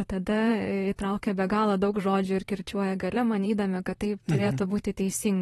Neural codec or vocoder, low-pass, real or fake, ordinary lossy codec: vocoder, 44.1 kHz, 128 mel bands, Pupu-Vocoder; 19.8 kHz; fake; AAC, 32 kbps